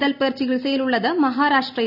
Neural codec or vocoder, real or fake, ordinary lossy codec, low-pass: none; real; none; 5.4 kHz